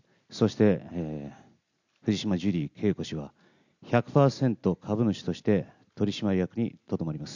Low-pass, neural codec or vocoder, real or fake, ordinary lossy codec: 7.2 kHz; none; real; none